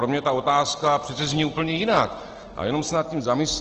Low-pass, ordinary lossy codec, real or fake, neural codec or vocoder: 7.2 kHz; Opus, 16 kbps; real; none